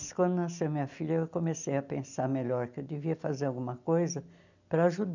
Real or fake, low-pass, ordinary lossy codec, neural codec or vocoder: real; 7.2 kHz; none; none